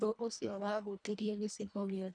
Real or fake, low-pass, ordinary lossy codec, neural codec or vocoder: fake; 9.9 kHz; none; codec, 24 kHz, 1.5 kbps, HILCodec